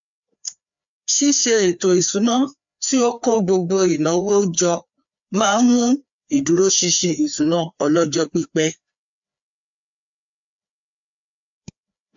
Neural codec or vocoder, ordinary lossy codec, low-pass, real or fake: codec, 16 kHz, 2 kbps, FreqCodec, larger model; MP3, 64 kbps; 7.2 kHz; fake